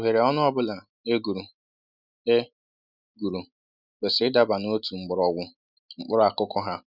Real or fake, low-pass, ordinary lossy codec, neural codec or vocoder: real; 5.4 kHz; none; none